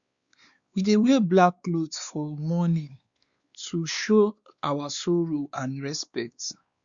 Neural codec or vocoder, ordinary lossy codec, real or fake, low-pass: codec, 16 kHz, 2 kbps, X-Codec, WavLM features, trained on Multilingual LibriSpeech; Opus, 64 kbps; fake; 7.2 kHz